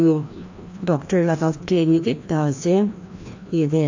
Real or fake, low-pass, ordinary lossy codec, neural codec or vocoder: fake; 7.2 kHz; AAC, 48 kbps; codec, 16 kHz, 1 kbps, FreqCodec, larger model